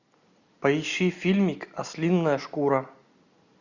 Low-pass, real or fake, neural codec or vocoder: 7.2 kHz; real; none